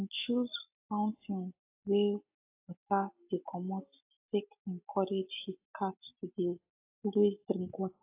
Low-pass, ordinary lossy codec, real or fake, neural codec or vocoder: 3.6 kHz; AAC, 32 kbps; real; none